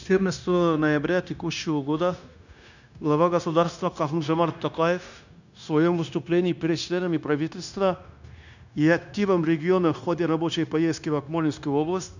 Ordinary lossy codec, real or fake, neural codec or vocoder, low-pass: none; fake; codec, 16 kHz, 0.9 kbps, LongCat-Audio-Codec; 7.2 kHz